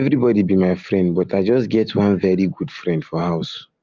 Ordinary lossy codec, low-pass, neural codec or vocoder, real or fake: Opus, 32 kbps; 7.2 kHz; none; real